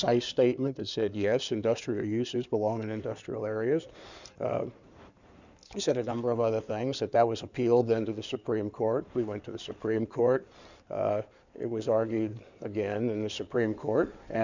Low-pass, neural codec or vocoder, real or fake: 7.2 kHz; codec, 16 kHz in and 24 kHz out, 2.2 kbps, FireRedTTS-2 codec; fake